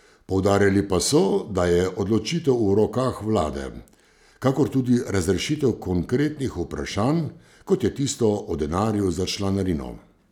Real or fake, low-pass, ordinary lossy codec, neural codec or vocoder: real; 19.8 kHz; none; none